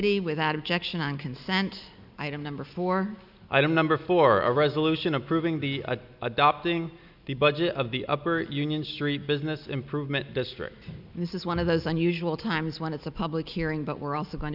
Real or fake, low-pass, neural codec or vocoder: real; 5.4 kHz; none